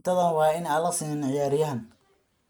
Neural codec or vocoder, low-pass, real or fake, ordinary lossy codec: none; none; real; none